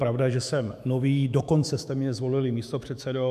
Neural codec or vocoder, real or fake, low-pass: autoencoder, 48 kHz, 128 numbers a frame, DAC-VAE, trained on Japanese speech; fake; 14.4 kHz